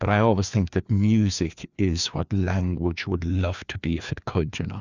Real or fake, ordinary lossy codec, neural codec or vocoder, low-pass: fake; Opus, 64 kbps; codec, 16 kHz, 2 kbps, FreqCodec, larger model; 7.2 kHz